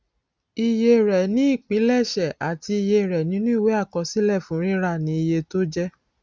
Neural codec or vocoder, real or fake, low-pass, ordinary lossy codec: none; real; none; none